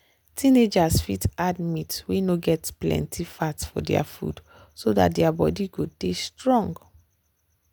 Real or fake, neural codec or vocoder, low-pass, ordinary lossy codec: real; none; none; none